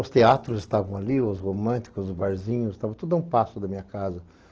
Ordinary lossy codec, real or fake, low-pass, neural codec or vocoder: Opus, 16 kbps; real; 7.2 kHz; none